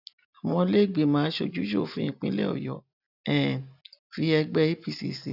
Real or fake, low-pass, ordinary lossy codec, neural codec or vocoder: real; 5.4 kHz; none; none